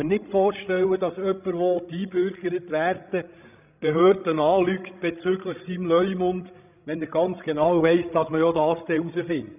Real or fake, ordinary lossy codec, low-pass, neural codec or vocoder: fake; none; 3.6 kHz; codec, 16 kHz, 16 kbps, FreqCodec, larger model